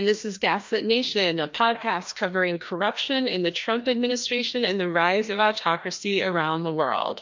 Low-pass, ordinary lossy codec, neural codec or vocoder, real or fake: 7.2 kHz; MP3, 48 kbps; codec, 16 kHz, 1 kbps, FreqCodec, larger model; fake